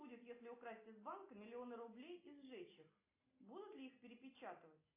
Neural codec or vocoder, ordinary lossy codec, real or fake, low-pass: none; AAC, 24 kbps; real; 3.6 kHz